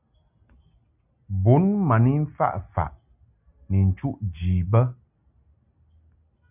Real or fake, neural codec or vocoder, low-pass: real; none; 3.6 kHz